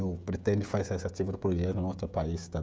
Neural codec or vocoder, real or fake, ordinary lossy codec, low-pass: codec, 16 kHz, 16 kbps, FreqCodec, smaller model; fake; none; none